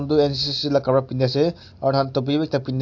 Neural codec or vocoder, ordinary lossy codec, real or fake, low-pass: none; AAC, 48 kbps; real; 7.2 kHz